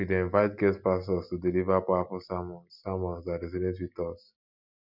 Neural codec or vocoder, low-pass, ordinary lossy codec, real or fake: none; 5.4 kHz; none; real